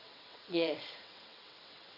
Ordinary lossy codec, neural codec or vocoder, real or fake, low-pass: none; vocoder, 22.05 kHz, 80 mel bands, WaveNeXt; fake; 5.4 kHz